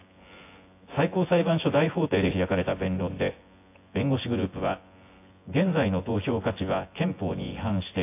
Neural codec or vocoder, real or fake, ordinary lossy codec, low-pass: vocoder, 24 kHz, 100 mel bands, Vocos; fake; AAC, 24 kbps; 3.6 kHz